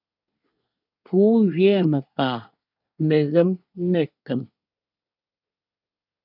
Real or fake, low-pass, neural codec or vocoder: fake; 5.4 kHz; codec, 24 kHz, 1 kbps, SNAC